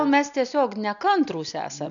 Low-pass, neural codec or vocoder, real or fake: 7.2 kHz; none; real